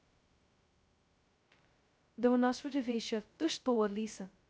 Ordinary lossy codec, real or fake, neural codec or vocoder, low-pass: none; fake; codec, 16 kHz, 0.2 kbps, FocalCodec; none